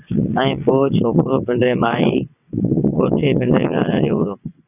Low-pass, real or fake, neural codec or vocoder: 3.6 kHz; fake; vocoder, 22.05 kHz, 80 mel bands, WaveNeXt